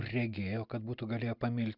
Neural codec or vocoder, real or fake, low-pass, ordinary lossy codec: none; real; 5.4 kHz; Opus, 64 kbps